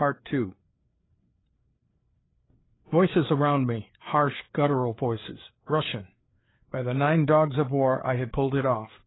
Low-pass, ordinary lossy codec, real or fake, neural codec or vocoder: 7.2 kHz; AAC, 16 kbps; fake; codec, 16 kHz, 4 kbps, FreqCodec, larger model